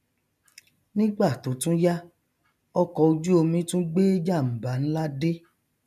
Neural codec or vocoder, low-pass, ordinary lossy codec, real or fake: none; 14.4 kHz; none; real